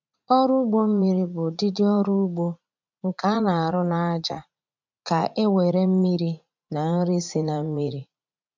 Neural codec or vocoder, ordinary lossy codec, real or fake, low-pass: vocoder, 44.1 kHz, 80 mel bands, Vocos; MP3, 64 kbps; fake; 7.2 kHz